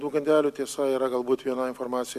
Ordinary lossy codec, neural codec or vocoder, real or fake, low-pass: AAC, 96 kbps; none; real; 14.4 kHz